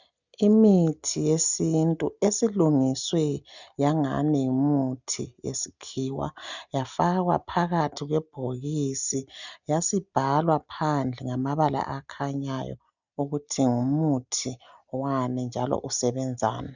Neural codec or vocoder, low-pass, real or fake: none; 7.2 kHz; real